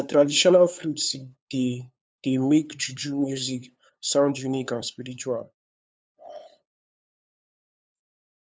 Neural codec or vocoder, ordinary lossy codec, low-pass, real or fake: codec, 16 kHz, 2 kbps, FunCodec, trained on LibriTTS, 25 frames a second; none; none; fake